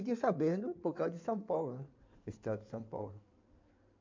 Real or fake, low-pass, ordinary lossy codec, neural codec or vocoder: fake; 7.2 kHz; MP3, 48 kbps; codec, 16 kHz in and 24 kHz out, 2.2 kbps, FireRedTTS-2 codec